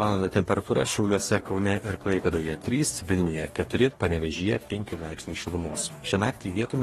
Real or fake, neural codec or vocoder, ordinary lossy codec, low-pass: fake; codec, 44.1 kHz, 2.6 kbps, DAC; AAC, 32 kbps; 19.8 kHz